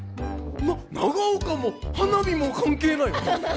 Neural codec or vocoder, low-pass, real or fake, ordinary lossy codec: none; none; real; none